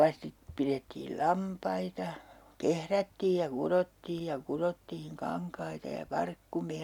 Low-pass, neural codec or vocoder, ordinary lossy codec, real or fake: 19.8 kHz; none; none; real